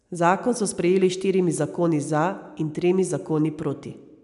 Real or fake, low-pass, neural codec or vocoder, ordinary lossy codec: real; 10.8 kHz; none; none